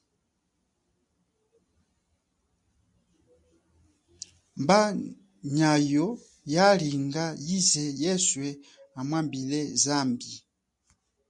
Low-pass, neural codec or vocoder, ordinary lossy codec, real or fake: 10.8 kHz; none; MP3, 64 kbps; real